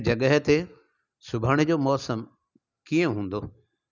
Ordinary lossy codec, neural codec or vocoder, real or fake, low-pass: none; none; real; 7.2 kHz